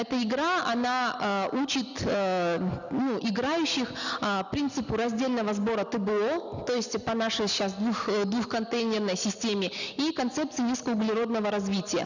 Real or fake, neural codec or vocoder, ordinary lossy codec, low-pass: real; none; none; 7.2 kHz